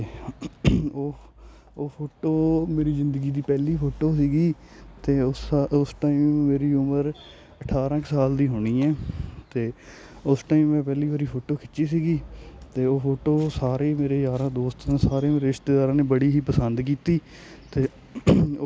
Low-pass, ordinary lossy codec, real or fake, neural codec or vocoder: none; none; real; none